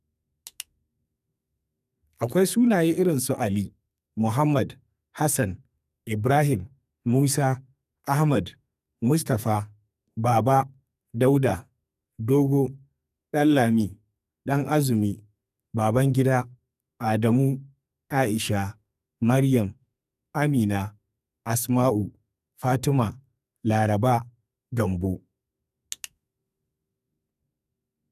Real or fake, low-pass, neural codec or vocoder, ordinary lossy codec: fake; 14.4 kHz; codec, 44.1 kHz, 2.6 kbps, SNAC; none